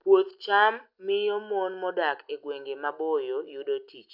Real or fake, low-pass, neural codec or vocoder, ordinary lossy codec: real; 5.4 kHz; none; none